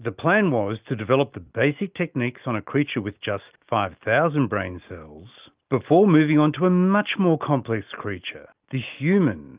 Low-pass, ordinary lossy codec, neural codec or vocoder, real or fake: 3.6 kHz; Opus, 32 kbps; none; real